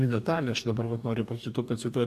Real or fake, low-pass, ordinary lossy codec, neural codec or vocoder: fake; 14.4 kHz; AAC, 96 kbps; codec, 44.1 kHz, 2.6 kbps, DAC